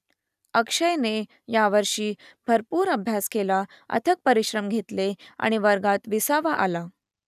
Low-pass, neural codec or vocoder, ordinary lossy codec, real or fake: 14.4 kHz; none; none; real